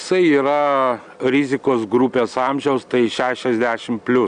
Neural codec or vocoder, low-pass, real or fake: none; 9.9 kHz; real